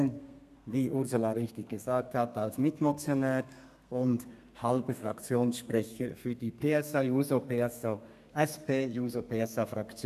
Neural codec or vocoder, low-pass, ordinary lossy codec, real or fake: codec, 32 kHz, 1.9 kbps, SNAC; 14.4 kHz; none; fake